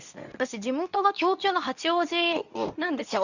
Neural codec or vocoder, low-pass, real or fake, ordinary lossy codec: codec, 24 kHz, 0.9 kbps, WavTokenizer, medium speech release version 2; 7.2 kHz; fake; none